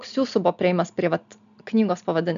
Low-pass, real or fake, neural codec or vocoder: 7.2 kHz; real; none